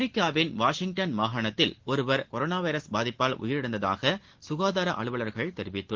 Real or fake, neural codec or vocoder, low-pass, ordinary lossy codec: real; none; 7.2 kHz; Opus, 16 kbps